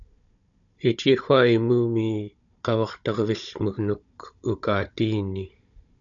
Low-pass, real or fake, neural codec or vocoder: 7.2 kHz; fake; codec, 16 kHz, 16 kbps, FunCodec, trained on Chinese and English, 50 frames a second